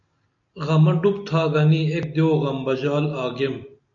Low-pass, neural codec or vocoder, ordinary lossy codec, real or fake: 7.2 kHz; none; MP3, 96 kbps; real